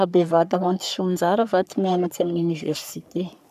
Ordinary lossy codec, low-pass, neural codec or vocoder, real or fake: none; 14.4 kHz; codec, 44.1 kHz, 3.4 kbps, Pupu-Codec; fake